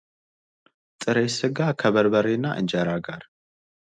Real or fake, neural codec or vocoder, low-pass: real; none; 9.9 kHz